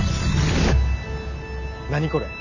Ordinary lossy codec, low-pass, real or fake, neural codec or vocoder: none; 7.2 kHz; real; none